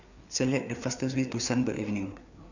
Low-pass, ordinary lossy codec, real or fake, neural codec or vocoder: 7.2 kHz; none; fake; codec, 16 kHz, 4 kbps, FreqCodec, larger model